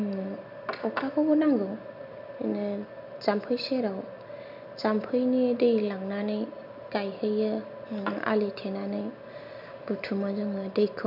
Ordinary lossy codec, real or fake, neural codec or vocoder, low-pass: none; real; none; 5.4 kHz